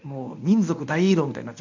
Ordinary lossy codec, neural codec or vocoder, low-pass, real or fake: none; codec, 24 kHz, 0.9 kbps, WavTokenizer, medium speech release version 1; 7.2 kHz; fake